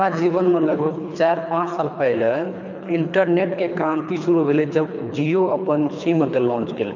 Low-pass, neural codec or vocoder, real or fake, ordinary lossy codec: 7.2 kHz; codec, 24 kHz, 3 kbps, HILCodec; fake; none